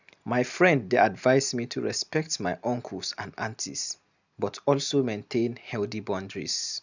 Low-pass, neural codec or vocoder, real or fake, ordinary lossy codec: 7.2 kHz; none; real; none